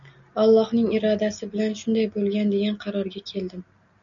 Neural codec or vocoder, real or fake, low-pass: none; real; 7.2 kHz